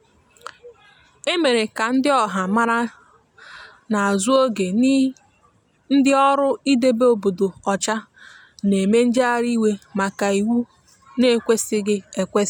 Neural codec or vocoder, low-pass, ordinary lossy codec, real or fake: none; 19.8 kHz; none; real